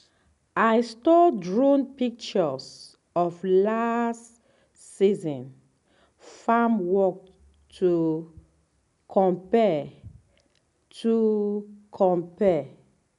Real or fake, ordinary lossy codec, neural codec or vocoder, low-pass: real; none; none; 10.8 kHz